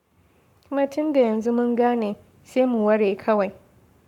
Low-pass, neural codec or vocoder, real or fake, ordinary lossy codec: 19.8 kHz; codec, 44.1 kHz, 7.8 kbps, Pupu-Codec; fake; MP3, 96 kbps